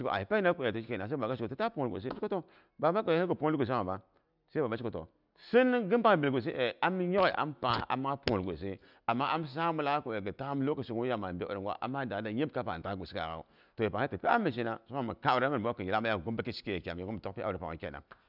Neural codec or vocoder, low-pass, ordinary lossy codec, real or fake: none; 5.4 kHz; none; real